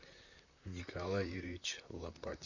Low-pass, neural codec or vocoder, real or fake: 7.2 kHz; vocoder, 44.1 kHz, 128 mel bands, Pupu-Vocoder; fake